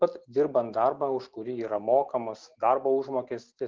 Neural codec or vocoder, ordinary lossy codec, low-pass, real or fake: none; Opus, 32 kbps; 7.2 kHz; real